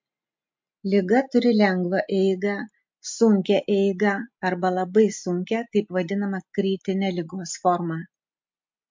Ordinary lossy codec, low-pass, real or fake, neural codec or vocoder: MP3, 48 kbps; 7.2 kHz; real; none